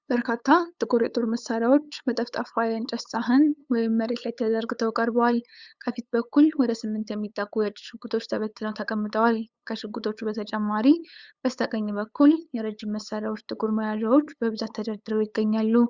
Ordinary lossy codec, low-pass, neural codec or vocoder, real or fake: Opus, 64 kbps; 7.2 kHz; codec, 16 kHz, 8 kbps, FunCodec, trained on LibriTTS, 25 frames a second; fake